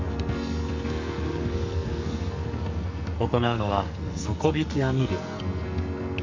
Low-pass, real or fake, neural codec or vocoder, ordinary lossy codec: 7.2 kHz; fake; codec, 44.1 kHz, 2.6 kbps, SNAC; MP3, 48 kbps